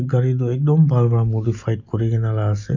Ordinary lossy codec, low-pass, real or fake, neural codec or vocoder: none; 7.2 kHz; real; none